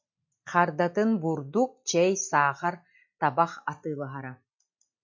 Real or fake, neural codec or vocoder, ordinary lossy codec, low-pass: real; none; MP3, 48 kbps; 7.2 kHz